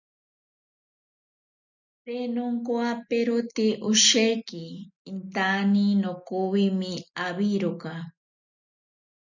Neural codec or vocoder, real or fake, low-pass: none; real; 7.2 kHz